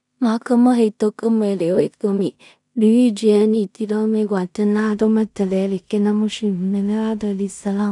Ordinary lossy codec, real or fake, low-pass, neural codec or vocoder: none; fake; 10.8 kHz; codec, 16 kHz in and 24 kHz out, 0.4 kbps, LongCat-Audio-Codec, two codebook decoder